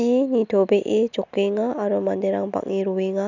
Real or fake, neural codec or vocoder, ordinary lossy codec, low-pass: real; none; none; 7.2 kHz